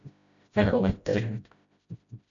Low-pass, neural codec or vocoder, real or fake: 7.2 kHz; codec, 16 kHz, 0.5 kbps, FreqCodec, smaller model; fake